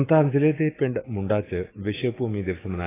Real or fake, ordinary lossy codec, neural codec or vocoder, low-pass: fake; AAC, 16 kbps; autoencoder, 48 kHz, 128 numbers a frame, DAC-VAE, trained on Japanese speech; 3.6 kHz